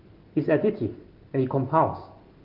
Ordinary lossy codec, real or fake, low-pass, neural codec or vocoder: Opus, 24 kbps; real; 5.4 kHz; none